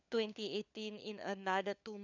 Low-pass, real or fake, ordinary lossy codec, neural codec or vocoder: 7.2 kHz; fake; none; codec, 16 kHz, 4 kbps, FunCodec, trained on LibriTTS, 50 frames a second